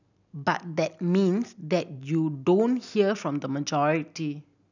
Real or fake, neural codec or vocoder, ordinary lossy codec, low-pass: real; none; none; 7.2 kHz